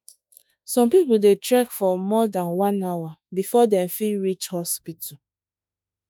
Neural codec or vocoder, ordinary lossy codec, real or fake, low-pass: autoencoder, 48 kHz, 32 numbers a frame, DAC-VAE, trained on Japanese speech; none; fake; none